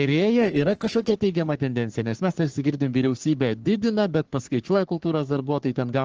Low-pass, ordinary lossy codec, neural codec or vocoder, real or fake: 7.2 kHz; Opus, 16 kbps; codec, 44.1 kHz, 3.4 kbps, Pupu-Codec; fake